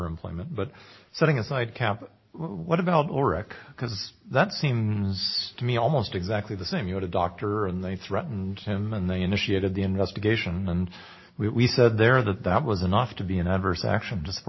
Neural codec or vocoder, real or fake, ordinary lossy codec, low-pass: none; real; MP3, 24 kbps; 7.2 kHz